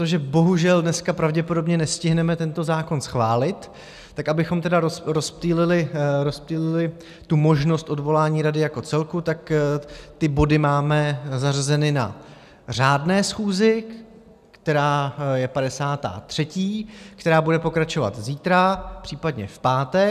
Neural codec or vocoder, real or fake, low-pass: none; real; 14.4 kHz